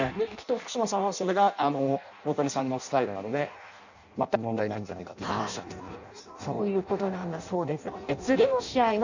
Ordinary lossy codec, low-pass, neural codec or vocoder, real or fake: none; 7.2 kHz; codec, 16 kHz in and 24 kHz out, 0.6 kbps, FireRedTTS-2 codec; fake